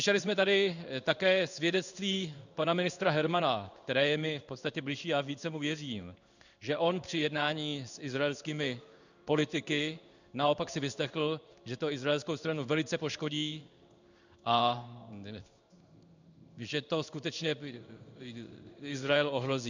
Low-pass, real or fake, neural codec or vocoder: 7.2 kHz; fake; codec, 16 kHz in and 24 kHz out, 1 kbps, XY-Tokenizer